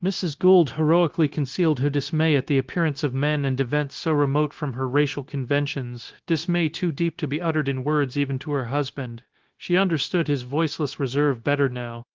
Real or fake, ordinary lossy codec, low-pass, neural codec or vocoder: fake; Opus, 16 kbps; 7.2 kHz; codec, 16 kHz, 0.9 kbps, LongCat-Audio-Codec